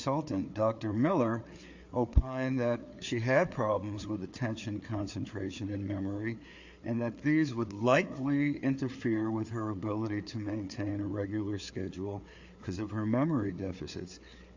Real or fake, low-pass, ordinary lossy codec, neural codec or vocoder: fake; 7.2 kHz; AAC, 48 kbps; codec, 16 kHz, 4 kbps, FreqCodec, larger model